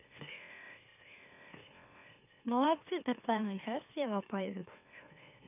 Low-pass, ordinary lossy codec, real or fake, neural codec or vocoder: 3.6 kHz; none; fake; autoencoder, 44.1 kHz, a latent of 192 numbers a frame, MeloTTS